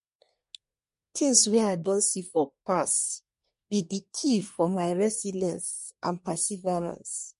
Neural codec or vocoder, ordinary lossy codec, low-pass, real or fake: codec, 24 kHz, 1 kbps, SNAC; MP3, 48 kbps; 10.8 kHz; fake